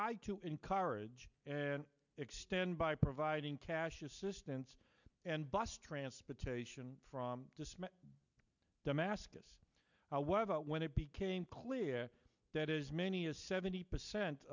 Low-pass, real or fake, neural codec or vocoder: 7.2 kHz; real; none